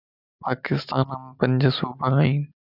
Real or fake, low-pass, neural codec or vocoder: real; 5.4 kHz; none